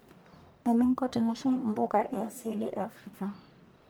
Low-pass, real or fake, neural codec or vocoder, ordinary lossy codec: none; fake; codec, 44.1 kHz, 1.7 kbps, Pupu-Codec; none